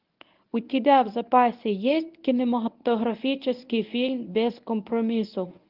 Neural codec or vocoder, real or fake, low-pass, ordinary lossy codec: codec, 24 kHz, 0.9 kbps, WavTokenizer, medium speech release version 1; fake; 5.4 kHz; Opus, 32 kbps